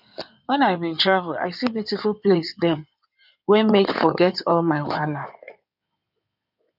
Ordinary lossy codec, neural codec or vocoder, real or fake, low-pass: none; codec, 16 kHz in and 24 kHz out, 2.2 kbps, FireRedTTS-2 codec; fake; 5.4 kHz